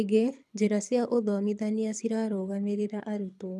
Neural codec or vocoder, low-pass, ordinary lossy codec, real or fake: codec, 24 kHz, 6 kbps, HILCodec; none; none; fake